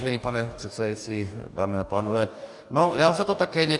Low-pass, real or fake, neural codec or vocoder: 10.8 kHz; fake; codec, 44.1 kHz, 2.6 kbps, DAC